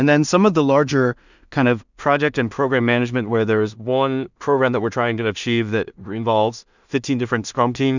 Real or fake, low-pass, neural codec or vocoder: fake; 7.2 kHz; codec, 16 kHz in and 24 kHz out, 0.4 kbps, LongCat-Audio-Codec, two codebook decoder